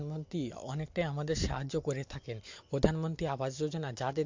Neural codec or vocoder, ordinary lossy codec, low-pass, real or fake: none; MP3, 48 kbps; 7.2 kHz; real